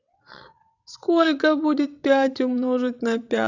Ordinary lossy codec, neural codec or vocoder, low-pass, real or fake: none; vocoder, 22.05 kHz, 80 mel bands, WaveNeXt; 7.2 kHz; fake